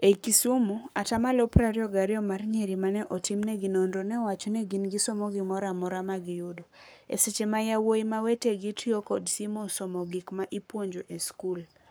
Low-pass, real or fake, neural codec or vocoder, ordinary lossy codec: none; fake; codec, 44.1 kHz, 7.8 kbps, Pupu-Codec; none